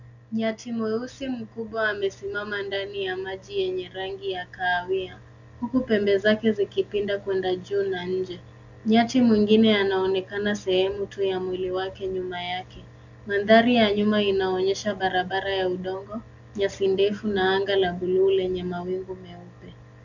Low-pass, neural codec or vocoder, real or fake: 7.2 kHz; none; real